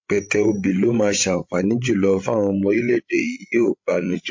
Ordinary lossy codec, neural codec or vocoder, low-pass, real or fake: MP3, 48 kbps; codec, 16 kHz, 16 kbps, FreqCodec, larger model; 7.2 kHz; fake